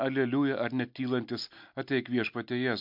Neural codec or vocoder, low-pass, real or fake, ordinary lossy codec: none; 5.4 kHz; real; MP3, 48 kbps